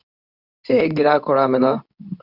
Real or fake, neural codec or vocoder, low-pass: fake; codec, 24 kHz, 0.9 kbps, WavTokenizer, medium speech release version 2; 5.4 kHz